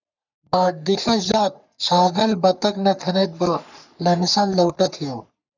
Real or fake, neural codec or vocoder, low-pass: fake; codec, 44.1 kHz, 3.4 kbps, Pupu-Codec; 7.2 kHz